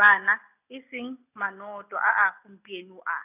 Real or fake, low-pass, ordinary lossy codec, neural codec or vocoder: real; 3.6 kHz; none; none